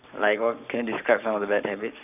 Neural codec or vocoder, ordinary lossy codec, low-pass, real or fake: codec, 44.1 kHz, 7.8 kbps, Pupu-Codec; none; 3.6 kHz; fake